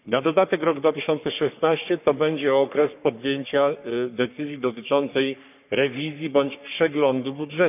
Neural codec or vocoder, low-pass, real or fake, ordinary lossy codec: codec, 44.1 kHz, 3.4 kbps, Pupu-Codec; 3.6 kHz; fake; none